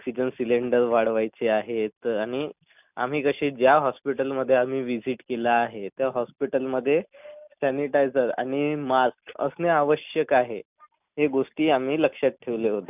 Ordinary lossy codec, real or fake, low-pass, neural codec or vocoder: none; real; 3.6 kHz; none